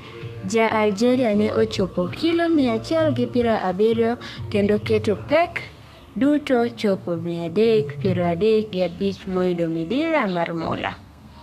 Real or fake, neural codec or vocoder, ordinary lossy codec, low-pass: fake; codec, 32 kHz, 1.9 kbps, SNAC; none; 14.4 kHz